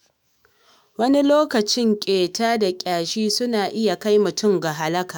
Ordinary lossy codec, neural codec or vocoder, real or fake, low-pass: none; autoencoder, 48 kHz, 128 numbers a frame, DAC-VAE, trained on Japanese speech; fake; none